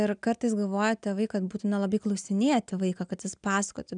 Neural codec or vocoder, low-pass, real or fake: none; 9.9 kHz; real